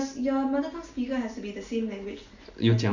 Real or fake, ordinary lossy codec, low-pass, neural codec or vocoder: real; none; 7.2 kHz; none